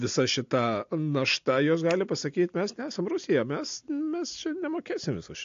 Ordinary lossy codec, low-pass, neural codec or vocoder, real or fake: AAC, 48 kbps; 7.2 kHz; none; real